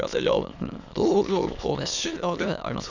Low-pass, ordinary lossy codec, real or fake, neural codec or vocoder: 7.2 kHz; none; fake; autoencoder, 22.05 kHz, a latent of 192 numbers a frame, VITS, trained on many speakers